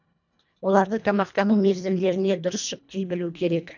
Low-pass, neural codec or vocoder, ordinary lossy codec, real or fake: 7.2 kHz; codec, 24 kHz, 1.5 kbps, HILCodec; none; fake